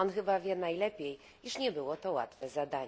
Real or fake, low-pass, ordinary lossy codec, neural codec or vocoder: real; none; none; none